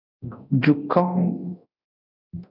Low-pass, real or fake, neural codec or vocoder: 5.4 kHz; fake; codec, 24 kHz, 0.9 kbps, DualCodec